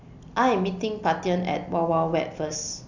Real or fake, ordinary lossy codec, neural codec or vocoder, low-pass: real; none; none; 7.2 kHz